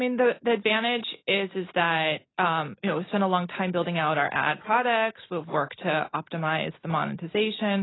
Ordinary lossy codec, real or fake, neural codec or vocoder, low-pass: AAC, 16 kbps; real; none; 7.2 kHz